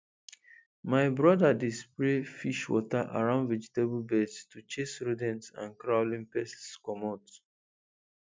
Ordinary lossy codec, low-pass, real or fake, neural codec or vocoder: none; none; real; none